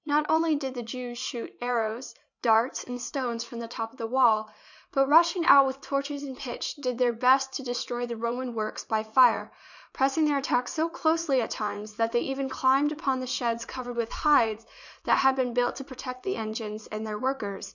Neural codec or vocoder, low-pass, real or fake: vocoder, 44.1 kHz, 80 mel bands, Vocos; 7.2 kHz; fake